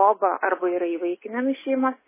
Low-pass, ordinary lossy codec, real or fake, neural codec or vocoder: 3.6 kHz; MP3, 16 kbps; real; none